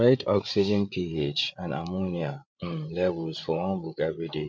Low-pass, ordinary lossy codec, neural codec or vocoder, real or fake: none; none; codec, 16 kHz, 8 kbps, FreqCodec, larger model; fake